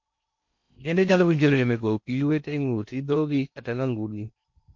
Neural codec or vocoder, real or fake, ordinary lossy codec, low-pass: codec, 16 kHz in and 24 kHz out, 0.8 kbps, FocalCodec, streaming, 65536 codes; fake; MP3, 48 kbps; 7.2 kHz